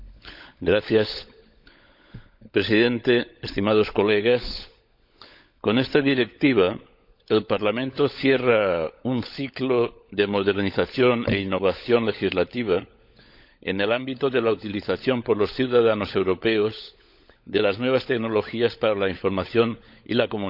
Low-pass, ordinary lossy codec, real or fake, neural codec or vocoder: 5.4 kHz; none; fake; codec, 16 kHz, 16 kbps, FunCodec, trained on LibriTTS, 50 frames a second